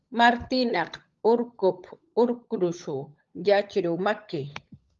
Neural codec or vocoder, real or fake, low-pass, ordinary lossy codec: codec, 16 kHz, 16 kbps, FunCodec, trained on LibriTTS, 50 frames a second; fake; 7.2 kHz; Opus, 32 kbps